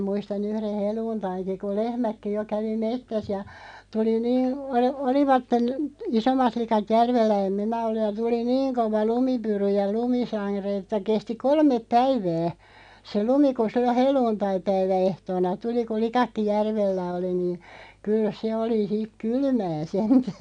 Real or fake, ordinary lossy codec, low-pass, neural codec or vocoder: real; none; 9.9 kHz; none